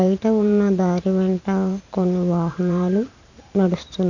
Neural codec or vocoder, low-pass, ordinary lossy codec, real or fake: vocoder, 44.1 kHz, 128 mel bands every 512 samples, BigVGAN v2; 7.2 kHz; none; fake